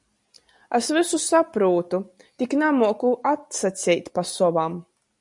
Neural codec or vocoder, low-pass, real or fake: none; 10.8 kHz; real